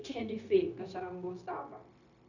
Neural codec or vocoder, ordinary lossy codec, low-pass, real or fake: codec, 16 kHz, 0.9 kbps, LongCat-Audio-Codec; none; 7.2 kHz; fake